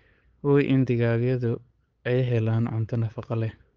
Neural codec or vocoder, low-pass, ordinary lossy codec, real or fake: codec, 16 kHz, 8 kbps, FunCodec, trained on LibriTTS, 25 frames a second; 7.2 kHz; Opus, 24 kbps; fake